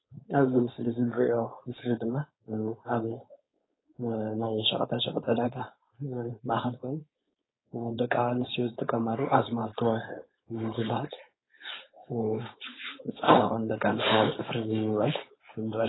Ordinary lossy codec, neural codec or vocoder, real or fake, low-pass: AAC, 16 kbps; codec, 16 kHz, 4.8 kbps, FACodec; fake; 7.2 kHz